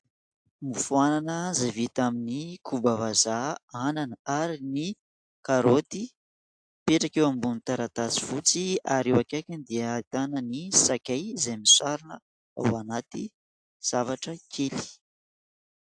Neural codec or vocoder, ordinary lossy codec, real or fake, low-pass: none; AAC, 64 kbps; real; 9.9 kHz